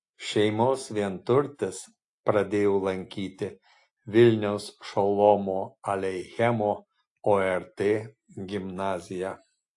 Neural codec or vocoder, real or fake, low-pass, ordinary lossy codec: none; real; 10.8 kHz; AAC, 48 kbps